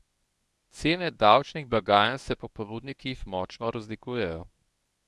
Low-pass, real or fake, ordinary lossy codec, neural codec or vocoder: none; fake; none; codec, 24 kHz, 0.9 kbps, WavTokenizer, medium speech release version 1